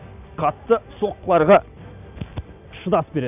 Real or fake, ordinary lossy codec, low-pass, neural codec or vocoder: real; none; 3.6 kHz; none